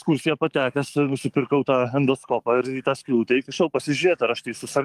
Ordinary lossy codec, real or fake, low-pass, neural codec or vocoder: Opus, 32 kbps; fake; 14.4 kHz; codec, 44.1 kHz, 7.8 kbps, DAC